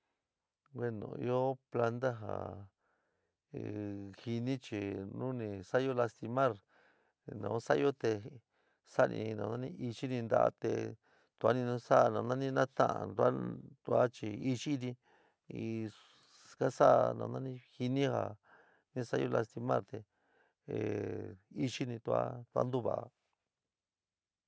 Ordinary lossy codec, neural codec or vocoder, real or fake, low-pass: none; none; real; none